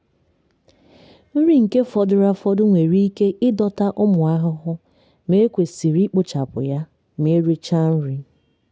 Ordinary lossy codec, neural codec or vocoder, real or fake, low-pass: none; none; real; none